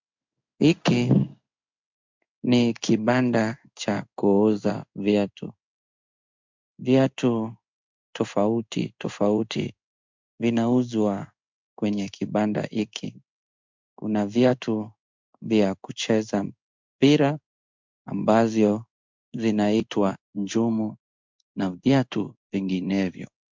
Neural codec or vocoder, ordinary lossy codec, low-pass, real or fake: codec, 16 kHz in and 24 kHz out, 1 kbps, XY-Tokenizer; MP3, 64 kbps; 7.2 kHz; fake